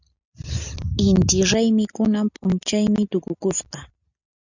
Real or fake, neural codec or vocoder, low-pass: real; none; 7.2 kHz